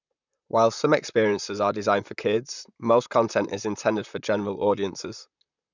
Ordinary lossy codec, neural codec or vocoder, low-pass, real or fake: none; vocoder, 44.1 kHz, 128 mel bands, Pupu-Vocoder; 7.2 kHz; fake